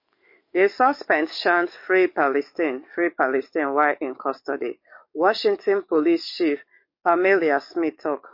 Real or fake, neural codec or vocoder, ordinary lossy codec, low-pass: fake; codec, 44.1 kHz, 7.8 kbps, DAC; MP3, 32 kbps; 5.4 kHz